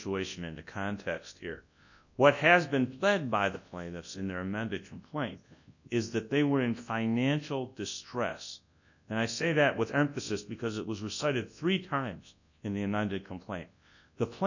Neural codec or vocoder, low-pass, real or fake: codec, 24 kHz, 0.9 kbps, WavTokenizer, large speech release; 7.2 kHz; fake